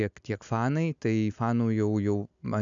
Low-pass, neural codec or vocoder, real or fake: 7.2 kHz; none; real